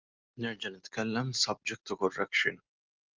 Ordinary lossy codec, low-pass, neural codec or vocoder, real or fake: Opus, 24 kbps; 7.2 kHz; none; real